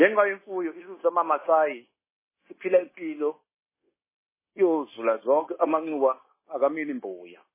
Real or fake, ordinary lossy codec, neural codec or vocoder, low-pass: fake; MP3, 16 kbps; codec, 16 kHz, 0.9 kbps, LongCat-Audio-Codec; 3.6 kHz